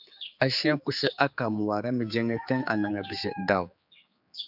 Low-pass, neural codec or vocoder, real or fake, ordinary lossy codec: 5.4 kHz; codec, 16 kHz, 4 kbps, X-Codec, HuBERT features, trained on general audio; fake; AAC, 48 kbps